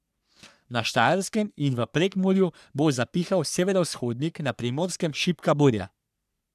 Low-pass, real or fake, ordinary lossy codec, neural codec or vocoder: 14.4 kHz; fake; none; codec, 44.1 kHz, 3.4 kbps, Pupu-Codec